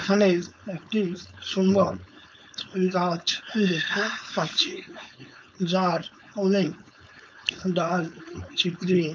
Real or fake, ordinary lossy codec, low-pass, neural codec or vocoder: fake; none; none; codec, 16 kHz, 4.8 kbps, FACodec